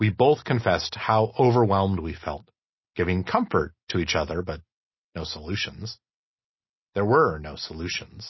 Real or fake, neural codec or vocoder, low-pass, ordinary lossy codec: real; none; 7.2 kHz; MP3, 24 kbps